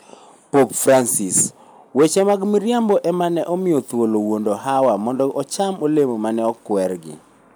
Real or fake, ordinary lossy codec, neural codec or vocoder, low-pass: real; none; none; none